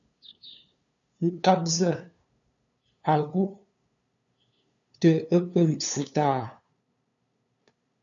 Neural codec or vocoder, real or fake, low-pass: codec, 16 kHz, 2 kbps, FunCodec, trained on LibriTTS, 25 frames a second; fake; 7.2 kHz